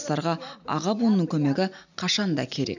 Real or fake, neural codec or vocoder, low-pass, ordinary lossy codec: real; none; 7.2 kHz; none